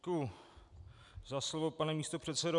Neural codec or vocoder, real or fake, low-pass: none; real; 10.8 kHz